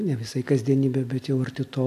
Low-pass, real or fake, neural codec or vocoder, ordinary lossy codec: 14.4 kHz; real; none; AAC, 64 kbps